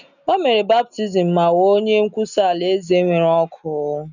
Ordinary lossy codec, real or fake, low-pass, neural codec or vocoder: none; real; 7.2 kHz; none